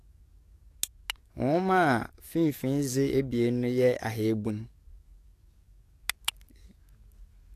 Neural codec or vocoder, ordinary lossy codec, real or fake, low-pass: codec, 44.1 kHz, 7.8 kbps, DAC; AAC, 64 kbps; fake; 14.4 kHz